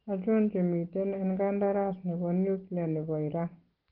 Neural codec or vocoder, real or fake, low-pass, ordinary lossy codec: none; real; 5.4 kHz; Opus, 16 kbps